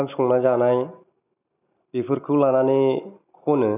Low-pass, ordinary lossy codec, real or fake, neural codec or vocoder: 3.6 kHz; none; real; none